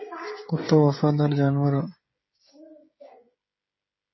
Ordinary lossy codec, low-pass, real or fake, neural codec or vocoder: MP3, 24 kbps; 7.2 kHz; fake; codec, 16 kHz, 16 kbps, FreqCodec, smaller model